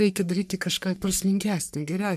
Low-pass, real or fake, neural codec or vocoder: 14.4 kHz; fake; codec, 44.1 kHz, 3.4 kbps, Pupu-Codec